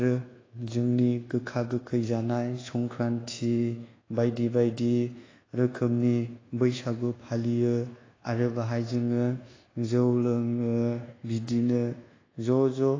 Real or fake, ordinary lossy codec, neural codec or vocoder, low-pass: fake; AAC, 32 kbps; codec, 24 kHz, 1.2 kbps, DualCodec; 7.2 kHz